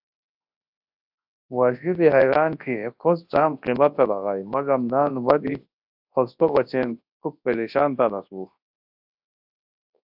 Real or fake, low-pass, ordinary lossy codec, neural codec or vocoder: fake; 5.4 kHz; AAC, 48 kbps; codec, 24 kHz, 0.9 kbps, WavTokenizer, large speech release